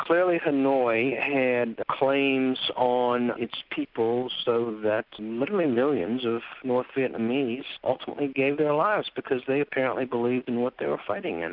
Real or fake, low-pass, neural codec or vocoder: real; 5.4 kHz; none